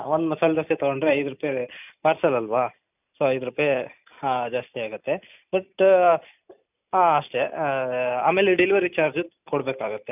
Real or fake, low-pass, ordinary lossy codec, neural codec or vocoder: real; 3.6 kHz; none; none